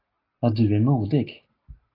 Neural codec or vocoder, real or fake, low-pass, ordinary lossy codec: none; real; 5.4 kHz; AAC, 24 kbps